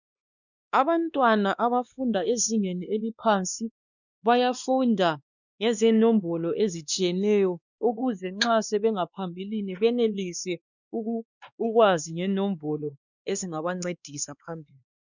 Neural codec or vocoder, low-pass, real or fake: codec, 16 kHz, 2 kbps, X-Codec, WavLM features, trained on Multilingual LibriSpeech; 7.2 kHz; fake